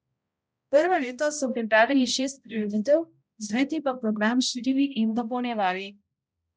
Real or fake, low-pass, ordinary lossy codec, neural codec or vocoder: fake; none; none; codec, 16 kHz, 0.5 kbps, X-Codec, HuBERT features, trained on balanced general audio